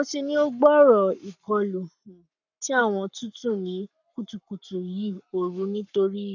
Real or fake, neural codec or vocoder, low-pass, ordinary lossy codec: fake; codec, 44.1 kHz, 7.8 kbps, Pupu-Codec; 7.2 kHz; none